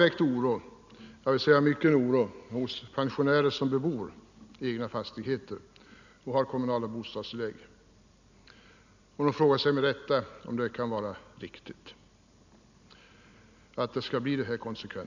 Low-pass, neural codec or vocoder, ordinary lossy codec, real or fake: 7.2 kHz; none; none; real